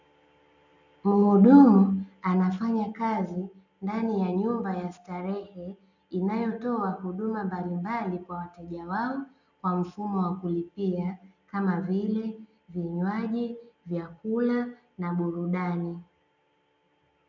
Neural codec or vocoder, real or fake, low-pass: none; real; 7.2 kHz